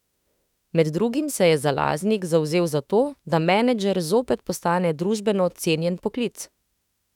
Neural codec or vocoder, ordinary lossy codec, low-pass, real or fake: autoencoder, 48 kHz, 32 numbers a frame, DAC-VAE, trained on Japanese speech; none; 19.8 kHz; fake